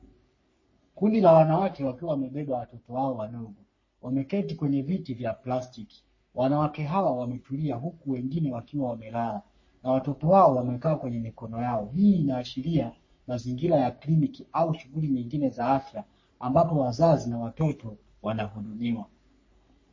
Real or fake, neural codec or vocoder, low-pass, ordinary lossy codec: fake; codec, 44.1 kHz, 3.4 kbps, Pupu-Codec; 7.2 kHz; MP3, 32 kbps